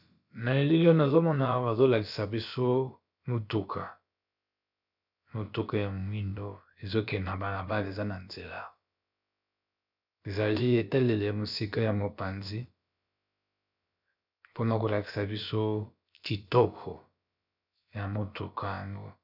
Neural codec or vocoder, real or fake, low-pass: codec, 16 kHz, about 1 kbps, DyCAST, with the encoder's durations; fake; 5.4 kHz